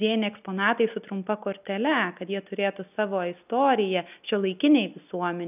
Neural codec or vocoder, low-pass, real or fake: none; 3.6 kHz; real